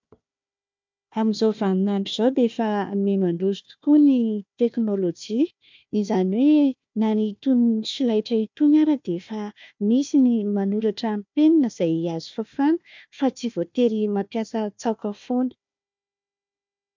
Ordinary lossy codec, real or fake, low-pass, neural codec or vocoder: MP3, 64 kbps; fake; 7.2 kHz; codec, 16 kHz, 1 kbps, FunCodec, trained on Chinese and English, 50 frames a second